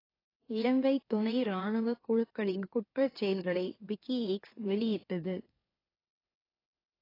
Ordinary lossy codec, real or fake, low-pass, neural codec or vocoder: AAC, 24 kbps; fake; 5.4 kHz; autoencoder, 44.1 kHz, a latent of 192 numbers a frame, MeloTTS